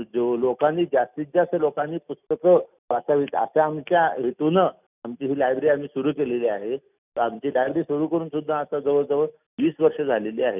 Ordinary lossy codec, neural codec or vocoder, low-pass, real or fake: none; none; 3.6 kHz; real